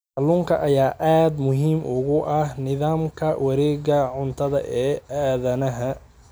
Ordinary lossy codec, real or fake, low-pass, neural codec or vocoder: none; real; none; none